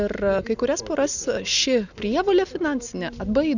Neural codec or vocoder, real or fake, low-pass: none; real; 7.2 kHz